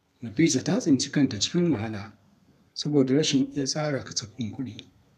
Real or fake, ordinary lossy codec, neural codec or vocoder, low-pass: fake; none; codec, 32 kHz, 1.9 kbps, SNAC; 14.4 kHz